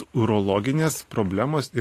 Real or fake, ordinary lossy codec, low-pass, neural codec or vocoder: real; AAC, 48 kbps; 14.4 kHz; none